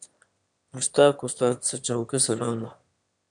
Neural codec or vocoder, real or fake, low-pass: autoencoder, 22.05 kHz, a latent of 192 numbers a frame, VITS, trained on one speaker; fake; 9.9 kHz